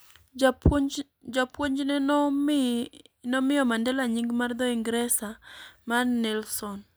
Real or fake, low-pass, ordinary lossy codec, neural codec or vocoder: real; none; none; none